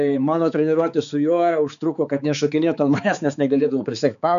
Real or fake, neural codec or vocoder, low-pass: fake; codec, 16 kHz, 4 kbps, X-Codec, HuBERT features, trained on balanced general audio; 7.2 kHz